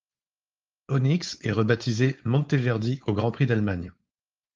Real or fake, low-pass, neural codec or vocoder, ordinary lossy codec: fake; 7.2 kHz; codec, 16 kHz, 4.8 kbps, FACodec; Opus, 24 kbps